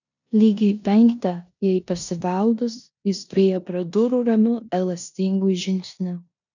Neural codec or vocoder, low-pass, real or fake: codec, 16 kHz in and 24 kHz out, 0.9 kbps, LongCat-Audio-Codec, four codebook decoder; 7.2 kHz; fake